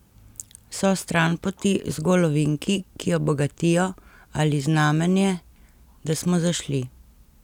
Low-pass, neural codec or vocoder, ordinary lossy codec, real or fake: 19.8 kHz; none; none; real